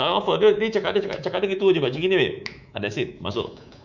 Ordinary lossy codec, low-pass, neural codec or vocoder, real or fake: none; 7.2 kHz; codec, 24 kHz, 3.1 kbps, DualCodec; fake